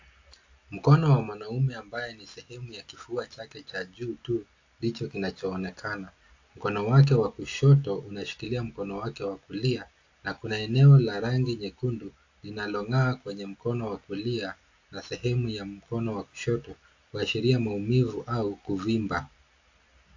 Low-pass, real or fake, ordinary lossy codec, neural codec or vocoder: 7.2 kHz; real; AAC, 48 kbps; none